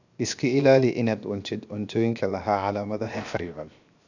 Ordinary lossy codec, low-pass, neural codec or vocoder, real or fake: none; 7.2 kHz; codec, 16 kHz, 0.7 kbps, FocalCodec; fake